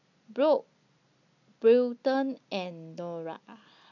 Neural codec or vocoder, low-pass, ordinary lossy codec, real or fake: none; 7.2 kHz; none; real